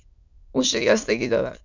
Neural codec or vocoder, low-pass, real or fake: autoencoder, 22.05 kHz, a latent of 192 numbers a frame, VITS, trained on many speakers; 7.2 kHz; fake